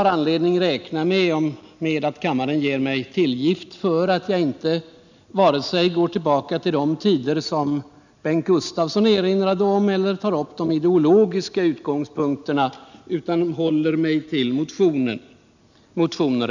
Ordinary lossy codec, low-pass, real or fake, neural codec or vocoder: none; 7.2 kHz; real; none